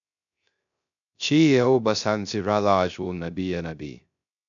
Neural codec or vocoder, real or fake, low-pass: codec, 16 kHz, 0.3 kbps, FocalCodec; fake; 7.2 kHz